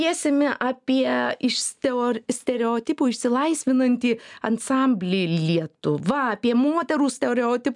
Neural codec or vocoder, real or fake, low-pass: none; real; 10.8 kHz